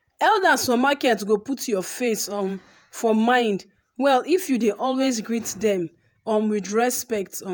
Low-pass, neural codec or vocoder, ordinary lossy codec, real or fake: none; vocoder, 48 kHz, 128 mel bands, Vocos; none; fake